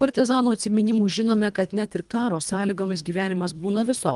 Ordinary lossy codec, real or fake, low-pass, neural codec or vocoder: Opus, 32 kbps; fake; 10.8 kHz; codec, 24 kHz, 1.5 kbps, HILCodec